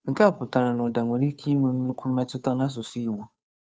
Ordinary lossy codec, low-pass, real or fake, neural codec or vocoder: none; none; fake; codec, 16 kHz, 2 kbps, FunCodec, trained on Chinese and English, 25 frames a second